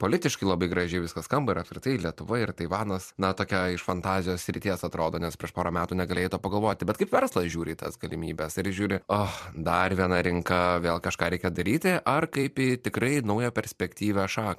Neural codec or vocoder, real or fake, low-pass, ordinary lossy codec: vocoder, 44.1 kHz, 128 mel bands every 256 samples, BigVGAN v2; fake; 14.4 kHz; MP3, 96 kbps